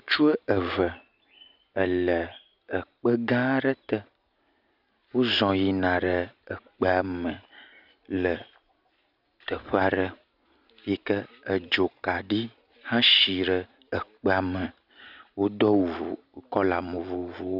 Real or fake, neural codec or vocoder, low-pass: real; none; 5.4 kHz